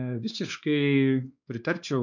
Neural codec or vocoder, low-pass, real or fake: codec, 16 kHz, 2 kbps, X-Codec, WavLM features, trained on Multilingual LibriSpeech; 7.2 kHz; fake